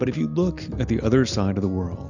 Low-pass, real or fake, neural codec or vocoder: 7.2 kHz; real; none